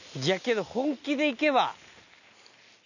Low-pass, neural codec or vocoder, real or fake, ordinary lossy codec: 7.2 kHz; none; real; none